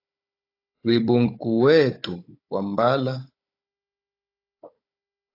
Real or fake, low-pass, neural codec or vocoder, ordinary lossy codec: fake; 5.4 kHz; codec, 16 kHz, 16 kbps, FunCodec, trained on Chinese and English, 50 frames a second; AAC, 32 kbps